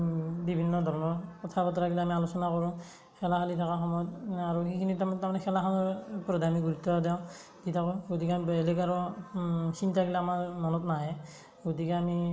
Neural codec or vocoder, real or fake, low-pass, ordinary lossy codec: none; real; none; none